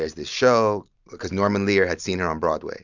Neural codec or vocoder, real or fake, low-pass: none; real; 7.2 kHz